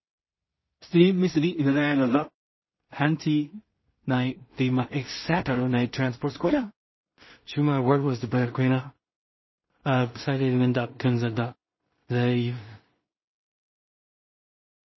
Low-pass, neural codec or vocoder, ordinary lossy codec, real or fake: 7.2 kHz; codec, 16 kHz in and 24 kHz out, 0.4 kbps, LongCat-Audio-Codec, two codebook decoder; MP3, 24 kbps; fake